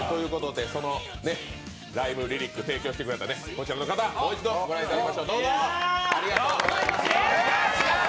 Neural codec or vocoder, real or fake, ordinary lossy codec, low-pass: none; real; none; none